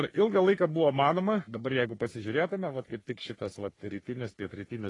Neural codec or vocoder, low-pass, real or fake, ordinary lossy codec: codec, 44.1 kHz, 3.4 kbps, Pupu-Codec; 10.8 kHz; fake; AAC, 32 kbps